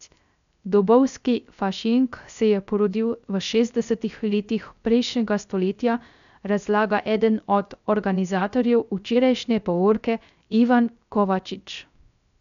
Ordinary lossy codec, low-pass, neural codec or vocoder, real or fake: none; 7.2 kHz; codec, 16 kHz, 0.3 kbps, FocalCodec; fake